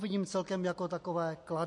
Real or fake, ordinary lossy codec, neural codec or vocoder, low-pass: real; MP3, 48 kbps; none; 10.8 kHz